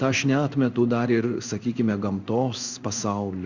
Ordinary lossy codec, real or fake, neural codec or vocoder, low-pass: Opus, 64 kbps; fake; codec, 16 kHz in and 24 kHz out, 1 kbps, XY-Tokenizer; 7.2 kHz